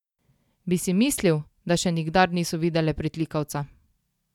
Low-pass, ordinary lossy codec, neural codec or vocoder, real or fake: 19.8 kHz; none; none; real